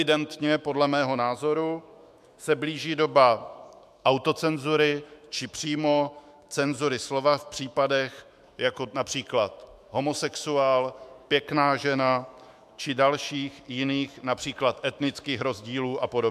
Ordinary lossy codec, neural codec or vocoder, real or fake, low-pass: MP3, 96 kbps; autoencoder, 48 kHz, 128 numbers a frame, DAC-VAE, trained on Japanese speech; fake; 14.4 kHz